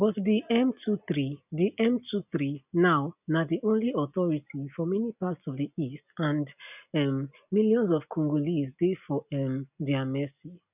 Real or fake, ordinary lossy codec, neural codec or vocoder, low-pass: real; none; none; 3.6 kHz